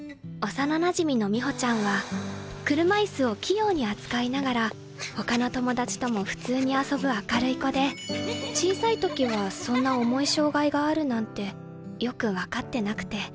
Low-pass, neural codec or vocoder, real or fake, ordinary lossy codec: none; none; real; none